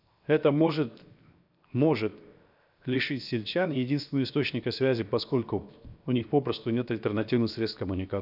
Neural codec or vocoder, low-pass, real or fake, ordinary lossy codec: codec, 16 kHz, 0.7 kbps, FocalCodec; 5.4 kHz; fake; none